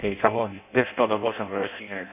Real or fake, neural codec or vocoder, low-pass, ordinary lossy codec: fake; codec, 16 kHz in and 24 kHz out, 0.6 kbps, FireRedTTS-2 codec; 3.6 kHz; none